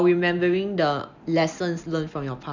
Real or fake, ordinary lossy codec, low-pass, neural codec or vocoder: real; none; 7.2 kHz; none